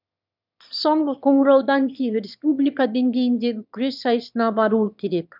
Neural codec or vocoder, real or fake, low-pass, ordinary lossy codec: autoencoder, 22.05 kHz, a latent of 192 numbers a frame, VITS, trained on one speaker; fake; 5.4 kHz; none